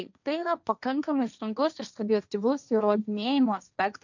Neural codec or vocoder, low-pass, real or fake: codec, 16 kHz, 1.1 kbps, Voila-Tokenizer; 7.2 kHz; fake